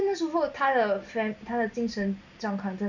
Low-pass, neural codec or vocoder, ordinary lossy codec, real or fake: 7.2 kHz; none; none; real